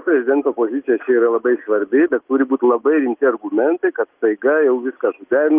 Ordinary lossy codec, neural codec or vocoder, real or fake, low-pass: Opus, 32 kbps; none; real; 3.6 kHz